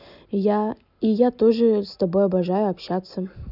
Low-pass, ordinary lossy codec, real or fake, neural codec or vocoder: 5.4 kHz; none; real; none